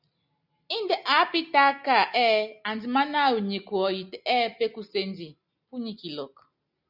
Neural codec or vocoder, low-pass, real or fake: none; 5.4 kHz; real